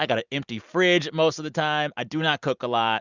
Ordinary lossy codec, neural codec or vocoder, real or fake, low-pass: Opus, 64 kbps; none; real; 7.2 kHz